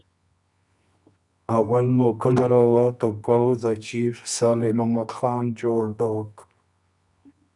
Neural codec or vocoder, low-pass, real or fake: codec, 24 kHz, 0.9 kbps, WavTokenizer, medium music audio release; 10.8 kHz; fake